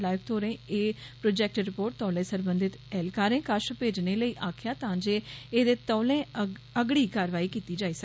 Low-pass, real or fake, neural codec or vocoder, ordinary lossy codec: none; real; none; none